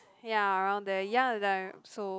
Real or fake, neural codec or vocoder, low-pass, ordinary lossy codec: real; none; none; none